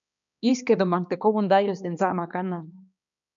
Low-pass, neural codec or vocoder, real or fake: 7.2 kHz; codec, 16 kHz, 2 kbps, X-Codec, HuBERT features, trained on balanced general audio; fake